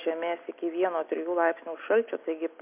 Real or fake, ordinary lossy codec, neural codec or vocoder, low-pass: real; MP3, 32 kbps; none; 3.6 kHz